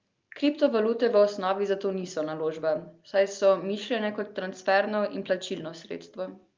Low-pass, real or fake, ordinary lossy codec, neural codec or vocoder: 7.2 kHz; real; Opus, 24 kbps; none